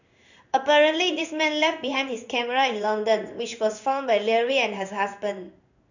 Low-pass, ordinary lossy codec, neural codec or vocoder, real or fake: 7.2 kHz; none; codec, 16 kHz in and 24 kHz out, 1 kbps, XY-Tokenizer; fake